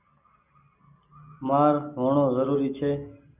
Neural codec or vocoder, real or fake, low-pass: none; real; 3.6 kHz